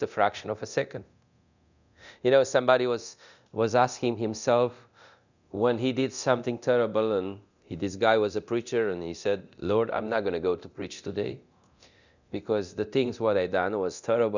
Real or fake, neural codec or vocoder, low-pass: fake; codec, 24 kHz, 0.9 kbps, DualCodec; 7.2 kHz